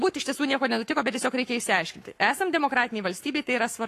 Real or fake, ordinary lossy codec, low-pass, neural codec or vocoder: fake; AAC, 48 kbps; 14.4 kHz; codec, 44.1 kHz, 7.8 kbps, Pupu-Codec